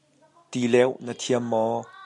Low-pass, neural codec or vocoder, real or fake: 10.8 kHz; none; real